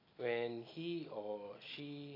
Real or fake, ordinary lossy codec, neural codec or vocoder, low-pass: real; AAC, 24 kbps; none; 5.4 kHz